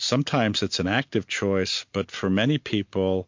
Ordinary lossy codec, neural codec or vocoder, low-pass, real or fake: MP3, 48 kbps; none; 7.2 kHz; real